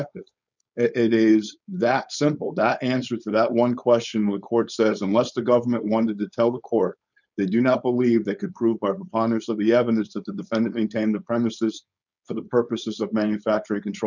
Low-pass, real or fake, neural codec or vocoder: 7.2 kHz; fake; codec, 16 kHz, 4.8 kbps, FACodec